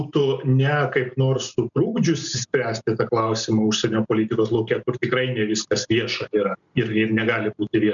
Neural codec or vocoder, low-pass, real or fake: none; 7.2 kHz; real